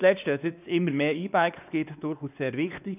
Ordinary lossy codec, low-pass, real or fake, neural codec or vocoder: none; 3.6 kHz; fake; codec, 16 kHz, 2 kbps, X-Codec, WavLM features, trained on Multilingual LibriSpeech